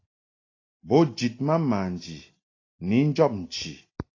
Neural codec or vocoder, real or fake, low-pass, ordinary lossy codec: none; real; 7.2 kHz; AAC, 32 kbps